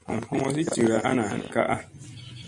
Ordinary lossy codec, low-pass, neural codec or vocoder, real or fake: MP3, 96 kbps; 10.8 kHz; none; real